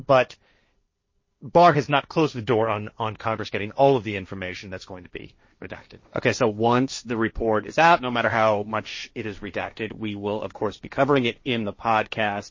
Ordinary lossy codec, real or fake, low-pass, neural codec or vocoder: MP3, 32 kbps; fake; 7.2 kHz; codec, 16 kHz, 1.1 kbps, Voila-Tokenizer